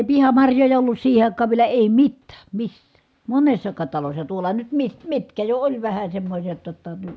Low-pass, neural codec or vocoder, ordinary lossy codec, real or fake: none; none; none; real